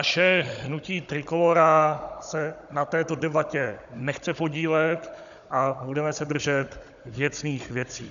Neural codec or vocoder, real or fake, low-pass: codec, 16 kHz, 16 kbps, FunCodec, trained on Chinese and English, 50 frames a second; fake; 7.2 kHz